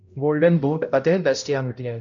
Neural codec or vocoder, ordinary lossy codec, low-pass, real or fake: codec, 16 kHz, 0.5 kbps, X-Codec, HuBERT features, trained on balanced general audio; MP3, 48 kbps; 7.2 kHz; fake